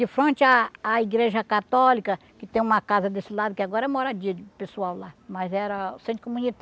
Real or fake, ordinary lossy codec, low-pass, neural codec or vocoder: real; none; none; none